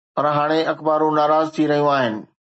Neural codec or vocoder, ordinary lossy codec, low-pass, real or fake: none; MP3, 32 kbps; 9.9 kHz; real